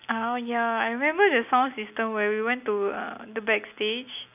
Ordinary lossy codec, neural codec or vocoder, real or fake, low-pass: none; none; real; 3.6 kHz